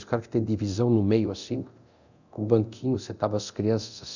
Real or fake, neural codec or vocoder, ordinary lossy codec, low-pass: fake; codec, 24 kHz, 0.9 kbps, DualCodec; none; 7.2 kHz